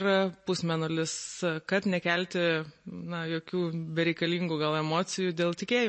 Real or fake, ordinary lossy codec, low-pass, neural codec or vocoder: real; MP3, 32 kbps; 9.9 kHz; none